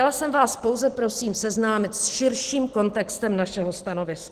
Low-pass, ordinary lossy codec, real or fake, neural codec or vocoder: 14.4 kHz; Opus, 16 kbps; real; none